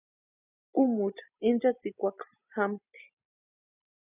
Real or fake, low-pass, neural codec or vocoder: real; 3.6 kHz; none